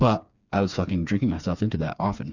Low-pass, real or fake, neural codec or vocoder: 7.2 kHz; fake; codec, 16 kHz, 4 kbps, FreqCodec, smaller model